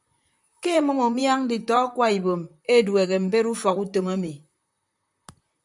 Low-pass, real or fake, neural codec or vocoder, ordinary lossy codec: 10.8 kHz; fake; vocoder, 44.1 kHz, 128 mel bands, Pupu-Vocoder; MP3, 96 kbps